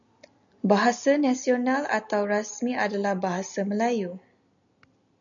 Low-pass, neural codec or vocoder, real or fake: 7.2 kHz; none; real